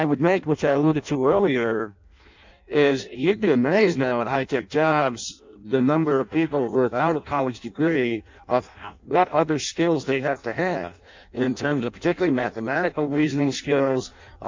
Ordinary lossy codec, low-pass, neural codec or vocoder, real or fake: MP3, 64 kbps; 7.2 kHz; codec, 16 kHz in and 24 kHz out, 0.6 kbps, FireRedTTS-2 codec; fake